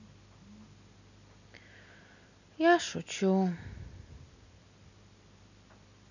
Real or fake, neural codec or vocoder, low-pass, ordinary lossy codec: real; none; 7.2 kHz; none